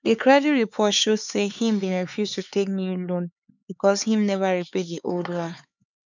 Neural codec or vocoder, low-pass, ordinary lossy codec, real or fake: codec, 16 kHz, 4 kbps, X-Codec, HuBERT features, trained on LibriSpeech; 7.2 kHz; none; fake